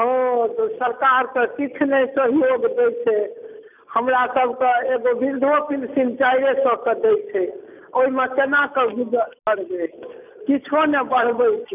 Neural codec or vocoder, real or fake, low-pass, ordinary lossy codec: none; real; 3.6 kHz; none